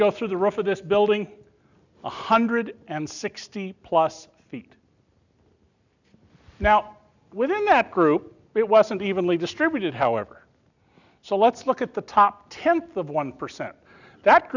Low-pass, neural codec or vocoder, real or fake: 7.2 kHz; none; real